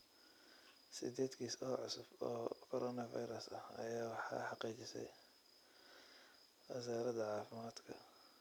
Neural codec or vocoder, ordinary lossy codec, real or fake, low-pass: vocoder, 44.1 kHz, 128 mel bands every 256 samples, BigVGAN v2; none; fake; none